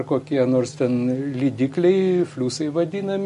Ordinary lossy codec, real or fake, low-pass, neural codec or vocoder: MP3, 48 kbps; real; 14.4 kHz; none